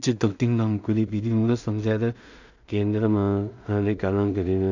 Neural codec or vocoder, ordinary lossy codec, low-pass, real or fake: codec, 16 kHz in and 24 kHz out, 0.4 kbps, LongCat-Audio-Codec, two codebook decoder; none; 7.2 kHz; fake